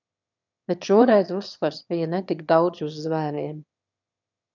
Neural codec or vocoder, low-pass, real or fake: autoencoder, 22.05 kHz, a latent of 192 numbers a frame, VITS, trained on one speaker; 7.2 kHz; fake